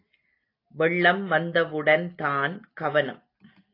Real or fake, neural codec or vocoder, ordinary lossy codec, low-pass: real; none; AAC, 32 kbps; 5.4 kHz